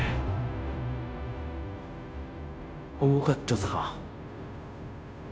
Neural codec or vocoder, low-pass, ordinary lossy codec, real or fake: codec, 16 kHz, 0.5 kbps, FunCodec, trained on Chinese and English, 25 frames a second; none; none; fake